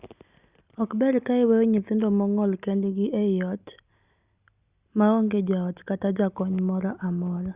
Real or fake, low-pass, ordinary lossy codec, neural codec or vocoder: real; 3.6 kHz; Opus, 64 kbps; none